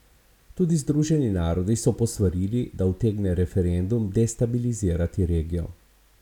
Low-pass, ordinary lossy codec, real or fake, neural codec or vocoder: 19.8 kHz; none; real; none